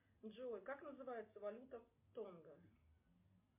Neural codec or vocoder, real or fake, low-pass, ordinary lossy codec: none; real; 3.6 kHz; MP3, 24 kbps